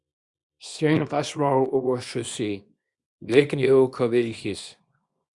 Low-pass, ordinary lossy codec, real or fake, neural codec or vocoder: 10.8 kHz; Opus, 64 kbps; fake; codec, 24 kHz, 0.9 kbps, WavTokenizer, small release